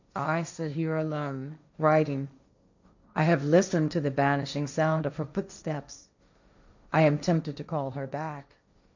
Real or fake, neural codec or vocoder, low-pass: fake; codec, 16 kHz, 1.1 kbps, Voila-Tokenizer; 7.2 kHz